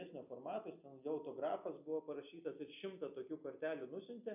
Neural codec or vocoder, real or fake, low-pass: none; real; 3.6 kHz